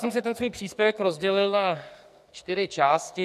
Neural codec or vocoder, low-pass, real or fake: codec, 44.1 kHz, 2.6 kbps, SNAC; 14.4 kHz; fake